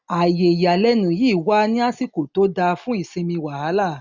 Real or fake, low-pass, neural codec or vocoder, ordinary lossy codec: real; 7.2 kHz; none; none